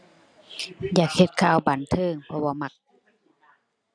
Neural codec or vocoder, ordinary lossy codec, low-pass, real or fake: none; none; 9.9 kHz; real